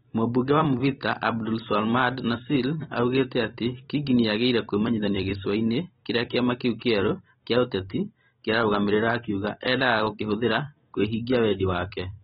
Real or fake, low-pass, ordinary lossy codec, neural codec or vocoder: fake; 19.8 kHz; AAC, 16 kbps; vocoder, 44.1 kHz, 128 mel bands every 256 samples, BigVGAN v2